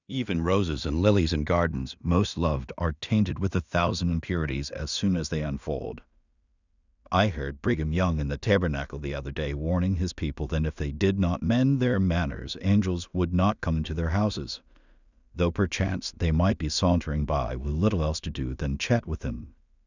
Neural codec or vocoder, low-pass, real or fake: codec, 16 kHz in and 24 kHz out, 0.4 kbps, LongCat-Audio-Codec, two codebook decoder; 7.2 kHz; fake